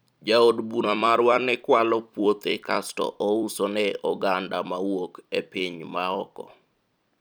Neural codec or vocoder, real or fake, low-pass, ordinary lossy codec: vocoder, 44.1 kHz, 128 mel bands every 256 samples, BigVGAN v2; fake; none; none